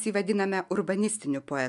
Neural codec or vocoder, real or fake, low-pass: none; real; 10.8 kHz